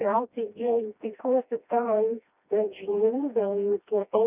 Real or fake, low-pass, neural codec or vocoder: fake; 3.6 kHz; codec, 16 kHz, 1 kbps, FreqCodec, smaller model